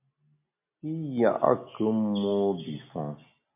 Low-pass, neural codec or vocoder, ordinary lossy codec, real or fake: 3.6 kHz; none; MP3, 32 kbps; real